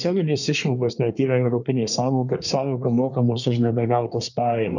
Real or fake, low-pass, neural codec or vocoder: fake; 7.2 kHz; codec, 24 kHz, 1 kbps, SNAC